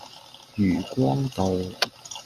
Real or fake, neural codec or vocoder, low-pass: real; none; 14.4 kHz